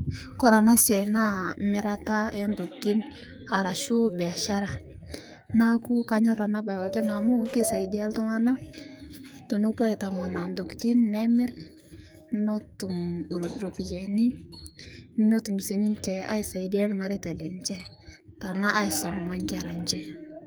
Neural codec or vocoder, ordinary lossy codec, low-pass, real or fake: codec, 44.1 kHz, 2.6 kbps, SNAC; none; none; fake